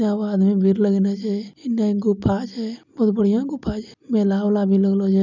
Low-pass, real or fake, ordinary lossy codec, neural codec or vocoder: 7.2 kHz; real; none; none